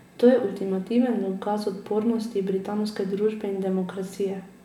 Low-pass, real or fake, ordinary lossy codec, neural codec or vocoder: 19.8 kHz; real; none; none